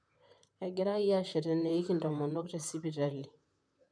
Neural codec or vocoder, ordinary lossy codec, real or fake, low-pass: vocoder, 44.1 kHz, 128 mel bands, Pupu-Vocoder; none; fake; 9.9 kHz